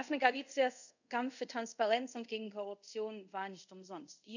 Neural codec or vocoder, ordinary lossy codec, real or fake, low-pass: codec, 24 kHz, 0.5 kbps, DualCodec; none; fake; 7.2 kHz